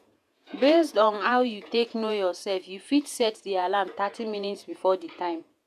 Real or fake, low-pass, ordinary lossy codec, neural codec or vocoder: fake; 14.4 kHz; none; vocoder, 48 kHz, 128 mel bands, Vocos